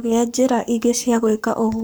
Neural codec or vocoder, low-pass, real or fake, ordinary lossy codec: codec, 44.1 kHz, 7.8 kbps, Pupu-Codec; none; fake; none